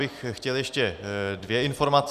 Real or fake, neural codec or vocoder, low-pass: fake; vocoder, 44.1 kHz, 128 mel bands every 256 samples, BigVGAN v2; 14.4 kHz